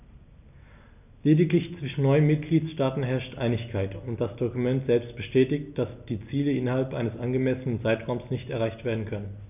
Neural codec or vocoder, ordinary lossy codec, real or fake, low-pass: none; none; real; 3.6 kHz